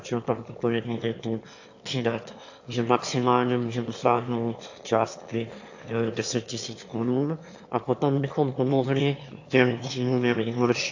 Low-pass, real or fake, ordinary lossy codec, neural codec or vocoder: 7.2 kHz; fake; AAC, 48 kbps; autoencoder, 22.05 kHz, a latent of 192 numbers a frame, VITS, trained on one speaker